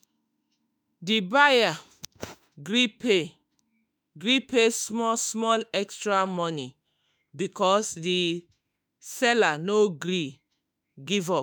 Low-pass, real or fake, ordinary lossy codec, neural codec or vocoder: none; fake; none; autoencoder, 48 kHz, 32 numbers a frame, DAC-VAE, trained on Japanese speech